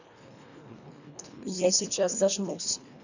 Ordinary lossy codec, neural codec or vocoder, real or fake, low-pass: none; codec, 24 kHz, 1.5 kbps, HILCodec; fake; 7.2 kHz